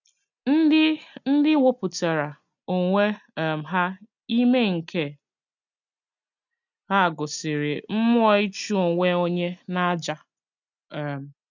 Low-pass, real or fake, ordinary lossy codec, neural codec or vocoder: 7.2 kHz; real; none; none